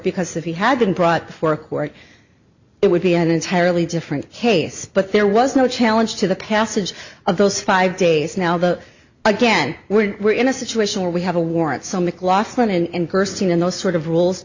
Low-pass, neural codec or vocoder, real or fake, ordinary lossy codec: 7.2 kHz; none; real; Opus, 64 kbps